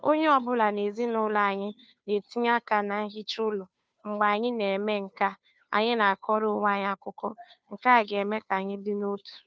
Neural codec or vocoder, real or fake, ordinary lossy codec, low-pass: codec, 16 kHz, 2 kbps, FunCodec, trained on Chinese and English, 25 frames a second; fake; none; none